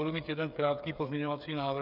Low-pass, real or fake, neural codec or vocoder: 5.4 kHz; fake; codec, 16 kHz, 8 kbps, FreqCodec, smaller model